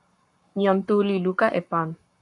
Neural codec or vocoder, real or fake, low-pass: codec, 44.1 kHz, 7.8 kbps, Pupu-Codec; fake; 10.8 kHz